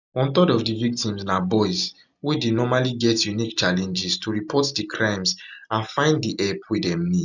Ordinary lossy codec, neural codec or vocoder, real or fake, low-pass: none; none; real; 7.2 kHz